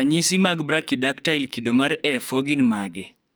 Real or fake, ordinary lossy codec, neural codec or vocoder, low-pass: fake; none; codec, 44.1 kHz, 2.6 kbps, SNAC; none